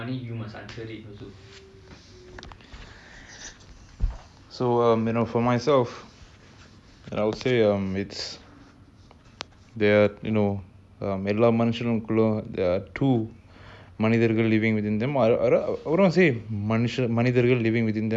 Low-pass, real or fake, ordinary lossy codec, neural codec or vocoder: none; real; none; none